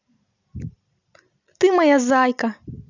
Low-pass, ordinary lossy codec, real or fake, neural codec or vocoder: 7.2 kHz; none; real; none